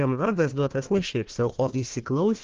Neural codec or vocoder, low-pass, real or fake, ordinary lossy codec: codec, 16 kHz, 1 kbps, FunCodec, trained on Chinese and English, 50 frames a second; 7.2 kHz; fake; Opus, 16 kbps